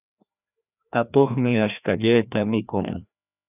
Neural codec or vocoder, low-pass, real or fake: codec, 16 kHz, 1 kbps, FreqCodec, larger model; 3.6 kHz; fake